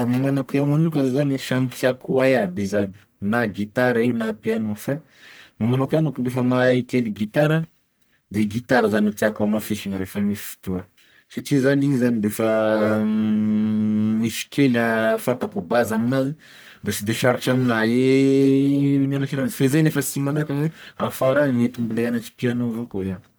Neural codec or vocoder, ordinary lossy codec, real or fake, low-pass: codec, 44.1 kHz, 1.7 kbps, Pupu-Codec; none; fake; none